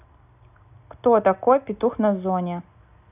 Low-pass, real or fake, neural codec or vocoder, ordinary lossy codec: 3.6 kHz; real; none; none